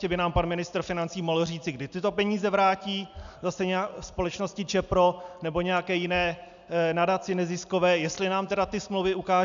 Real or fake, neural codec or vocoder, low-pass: real; none; 7.2 kHz